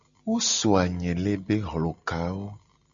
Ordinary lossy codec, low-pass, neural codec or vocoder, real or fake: AAC, 64 kbps; 7.2 kHz; none; real